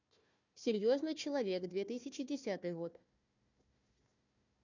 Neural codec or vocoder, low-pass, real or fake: codec, 16 kHz, 1 kbps, FunCodec, trained on Chinese and English, 50 frames a second; 7.2 kHz; fake